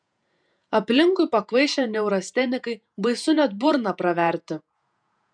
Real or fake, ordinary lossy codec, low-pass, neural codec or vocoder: fake; MP3, 96 kbps; 9.9 kHz; vocoder, 48 kHz, 128 mel bands, Vocos